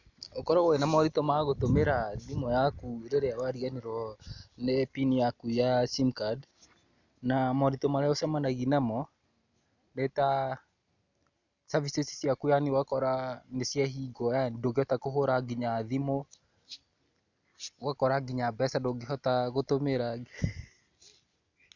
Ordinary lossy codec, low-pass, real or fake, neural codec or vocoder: none; 7.2 kHz; real; none